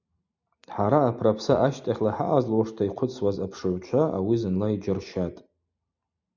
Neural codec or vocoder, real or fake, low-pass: none; real; 7.2 kHz